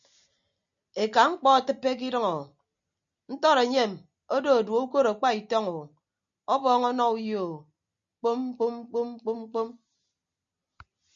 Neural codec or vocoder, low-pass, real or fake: none; 7.2 kHz; real